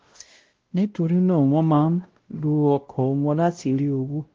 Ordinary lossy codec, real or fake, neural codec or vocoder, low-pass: Opus, 16 kbps; fake; codec, 16 kHz, 0.5 kbps, X-Codec, WavLM features, trained on Multilingual LibriSpeech; 7.2 kHz